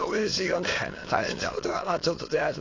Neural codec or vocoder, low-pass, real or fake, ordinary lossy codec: autoencoder, 22.05 kHz, a latent of 192 numbers a frame, VITS, trained on many speakers; 7.2 kHz; fake; AAC, 32 kbps